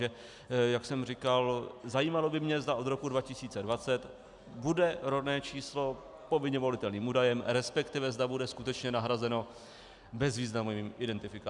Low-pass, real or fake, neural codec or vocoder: 10.8 kHz; real; none